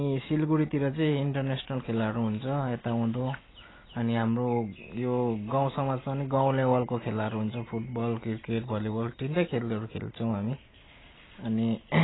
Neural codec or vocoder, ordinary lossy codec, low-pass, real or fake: none; AAC, 16 kbps; 7.2 kHz; real